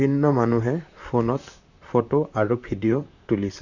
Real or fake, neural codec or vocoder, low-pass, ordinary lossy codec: fake; vocoder, 44.1 kHz, 128 mel bands, Pupu-Vocoder; 7.2 kHz; none